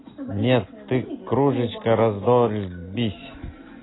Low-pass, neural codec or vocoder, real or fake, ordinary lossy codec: 7.2 kHz; none; real; AAC, 16 kbps